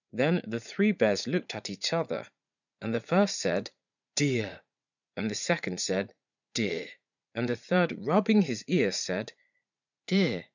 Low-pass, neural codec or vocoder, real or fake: 7.2 kHz; vocoder, 44.1 kHz, 80 mel bands, Vocos; fake